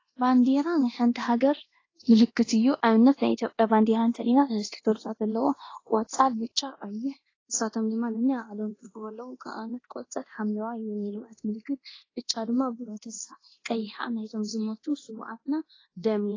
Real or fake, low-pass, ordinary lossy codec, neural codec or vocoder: fake; 7.2 kHz; AAC, 32 kbps; codec, 24 kHz, 0.9 kbps, DualCodec